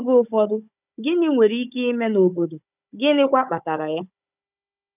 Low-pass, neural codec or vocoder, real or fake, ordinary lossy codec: 3.6 kHz; codec, 16 kHz, 16 kbps, FunCodec, trained on Chinese and English, 50 frames a second; fake; none